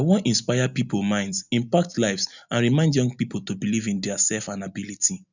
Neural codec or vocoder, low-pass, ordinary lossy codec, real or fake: none; 7.2 kHz; none; real